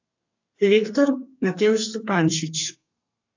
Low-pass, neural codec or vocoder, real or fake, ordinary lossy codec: 7.2 kHz; codec, 32 kHz, 1.9 kbps, SNAC; fake; AAC, 48 kbps